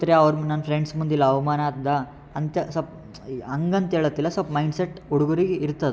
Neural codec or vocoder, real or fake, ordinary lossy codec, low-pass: none; real; none; none